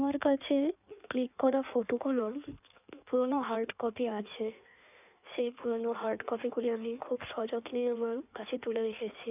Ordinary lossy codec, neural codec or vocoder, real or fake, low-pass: none; codec, 16 kHz in and 24 kHz out, 1.1 kbps, FireRedTTS-2 codec; fake; 3.6 kHz